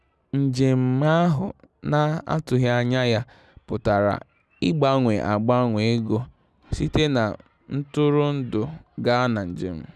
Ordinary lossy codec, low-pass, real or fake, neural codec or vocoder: none; none; real; none